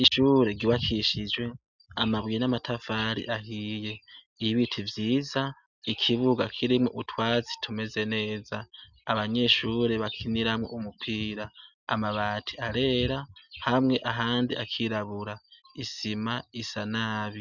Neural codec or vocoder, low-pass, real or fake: none; 7.2 kHz; real